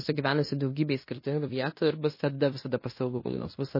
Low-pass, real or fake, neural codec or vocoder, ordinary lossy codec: 5.4 kHz; fake; codec, 16 kHz in and 24 kHz out, 0.9 kbps, LongCat-Audio-Codec, fine tuned four codebook decoder; MP3, 24 kbps